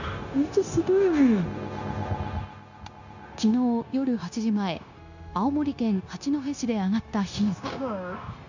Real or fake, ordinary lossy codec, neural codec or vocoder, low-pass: fake; none; codec, 16 kHz, 0.9 kbps, LongCat-Audio-Codec; 7.2 kHz